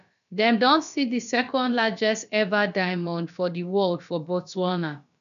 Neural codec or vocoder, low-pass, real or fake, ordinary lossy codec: codec, 16 kHz, about 1 kbps, DyCAST, with the encoder's durations; 7.2 kHz; fake; none